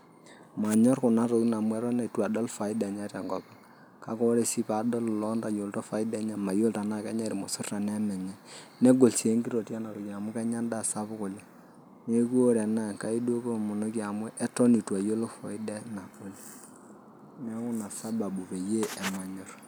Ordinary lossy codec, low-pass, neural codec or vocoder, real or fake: none; none; none; real